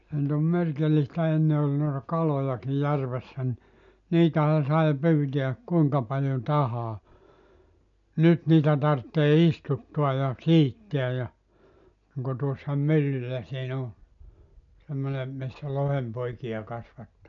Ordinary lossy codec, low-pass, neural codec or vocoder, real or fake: none; 7.2 kHz; none; real